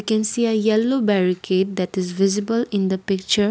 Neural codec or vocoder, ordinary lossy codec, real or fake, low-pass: none; none; real; none